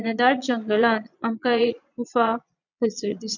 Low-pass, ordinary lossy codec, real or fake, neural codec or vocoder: 7.2 kHz; none; fake; vocoder, 22.05 kHz, 80 mel bands, Vocos